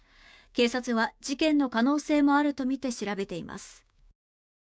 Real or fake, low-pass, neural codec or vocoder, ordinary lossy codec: fake; none; codec, 16 kHz, 6 kbps, DAC; none